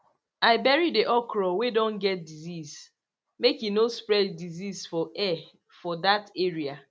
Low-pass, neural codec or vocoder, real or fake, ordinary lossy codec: none; none; real; none